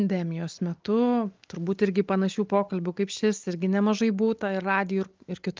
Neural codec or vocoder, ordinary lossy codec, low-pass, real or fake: none; Opus, 32 kbps; 7.2 kHz; real